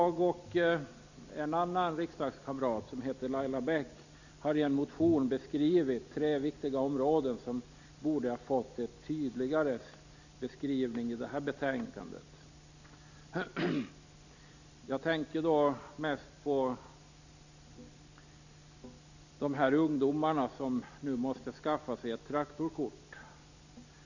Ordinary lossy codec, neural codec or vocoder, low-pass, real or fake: none; none; 7.2 kHz; real